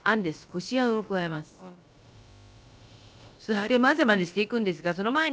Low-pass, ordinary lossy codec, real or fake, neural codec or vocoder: none; none; fake; codec, 16 kHz, about 1 kbps, DyCAST, with the encoder's durations